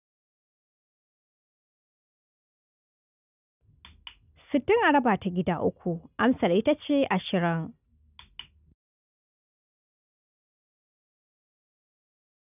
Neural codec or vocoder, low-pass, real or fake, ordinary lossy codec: none; 3.6 kHz; real; none